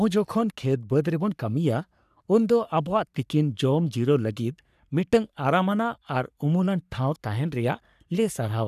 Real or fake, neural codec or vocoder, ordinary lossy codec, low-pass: fake; codec, 44.1 kHz, 3.4 kbps, Pupu-Codec; none; 14.4 kHz